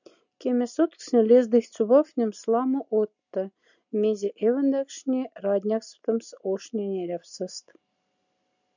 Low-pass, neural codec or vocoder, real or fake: 7.2 kHz; none; real